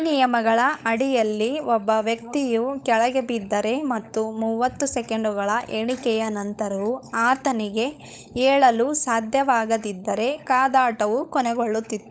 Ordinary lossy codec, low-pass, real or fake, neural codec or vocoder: none; none; fake; codec, 16 kHz, 16 kbps, FunCodec, trained on LibriTTS, 50 frames a second